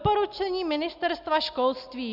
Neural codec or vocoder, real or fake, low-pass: none; real; 5.4 kHz